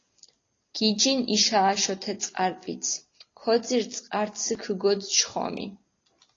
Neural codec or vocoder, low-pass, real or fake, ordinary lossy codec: none; 7.2 kHz; real; AAC, 32 kbps